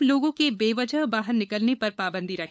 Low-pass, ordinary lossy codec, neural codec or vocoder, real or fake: none; none; codec, 16 kHz, 8 kbps, FunCodec, trained on LibriTTS, 25 frames a second; fake